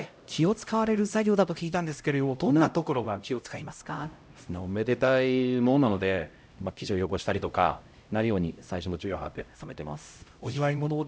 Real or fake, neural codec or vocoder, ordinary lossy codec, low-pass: fake; codec, 16 kHz, 0.5 kbps, X-Codec, HuBERT features, trained on LibriSpeech; none; none